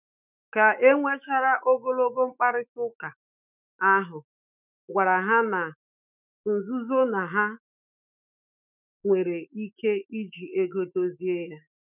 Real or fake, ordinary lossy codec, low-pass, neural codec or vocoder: fake; none; 3.6 kHz; autoencoder, 48 kHz, 128 numbers a frame, DAC-VAE, trained on Japanese speech